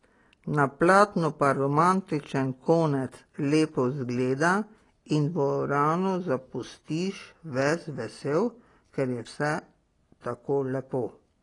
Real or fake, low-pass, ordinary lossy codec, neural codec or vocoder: real; 10.8 kHz; AAC, 32 kbps; none